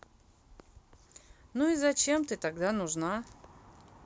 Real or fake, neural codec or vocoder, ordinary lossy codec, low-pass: real; none; none; none